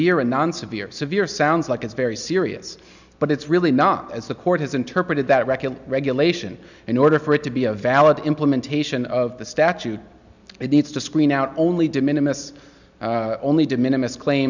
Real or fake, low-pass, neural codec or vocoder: real; 7.2 kHz; none